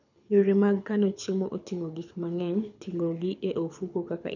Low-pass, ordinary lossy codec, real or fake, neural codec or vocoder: 7.2 kHz; none; fake; codec, 24 kHz, 6 kbps, HILCodec